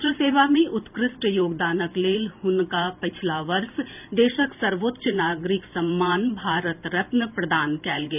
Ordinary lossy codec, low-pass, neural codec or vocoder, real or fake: none; 3.6 kHz; vocoder, 44.1 kHz, 128 mel bands every 512 samples, BigVGAN v2; fake